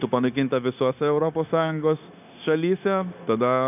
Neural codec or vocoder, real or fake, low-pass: codec, 16 kHz, 0.9 kbps, LongCat-Audio-Codec; fake; 3.6 kHz